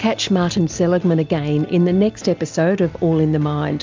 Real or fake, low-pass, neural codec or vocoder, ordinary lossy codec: real; 7.2 kHz; none; MP3, 64 kbps